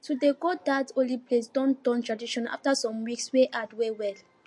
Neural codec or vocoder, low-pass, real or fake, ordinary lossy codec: none; 10.8 kHz; real; MP3, 48 kbps